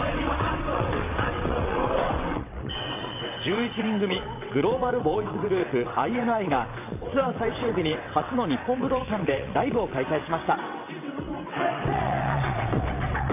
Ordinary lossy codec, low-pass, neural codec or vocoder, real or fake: AAC, 32 kbps; 3.6 kHz; vocoder, 22.05 kHz, 80 mel bands, WaveNeXt; fake